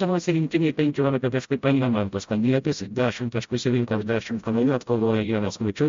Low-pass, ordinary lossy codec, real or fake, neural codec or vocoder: 7.2 kHz; MP3, 48 kbps; fake; codec, 16 kHz, 0.5 kbps, FreqCodec, smaller model